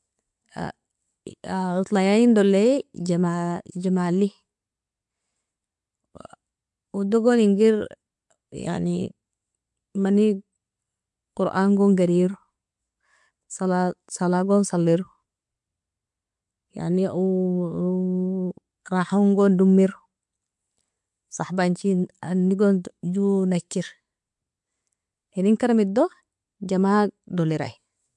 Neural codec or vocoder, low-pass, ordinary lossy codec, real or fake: none; 10.8 kHz; MP3, 64 kbps; real